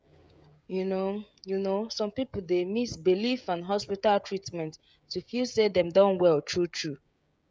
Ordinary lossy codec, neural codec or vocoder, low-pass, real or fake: none; codec, 16 kHz, 16 kbps, FreqCodec, smaller model; none; fake